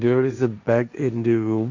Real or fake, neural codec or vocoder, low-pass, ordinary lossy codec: fake; codec, 16 kHz, 1.1 kbps, Voila-Tokenizer; 7.2 kHz; none